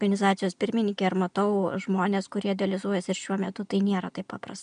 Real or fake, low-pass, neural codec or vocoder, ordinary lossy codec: real; 9.9 kHz; none; MP3, 96 kbps